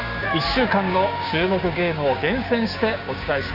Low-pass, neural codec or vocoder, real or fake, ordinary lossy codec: 5.4 kHz; codec, 16 kHz, 6 kbps, DAC; fake; none